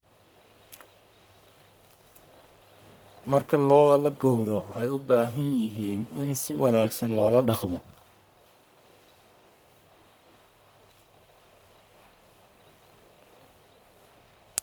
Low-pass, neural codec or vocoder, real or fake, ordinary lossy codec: none; codec, 44.1 kHz, 1.7 kbps, Pupu-Codec; fake; none